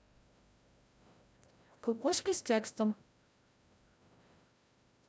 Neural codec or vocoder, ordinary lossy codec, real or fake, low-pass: codec, 16 kHz, 0.5 kbps, FreqCodec, larger model; none; fake; none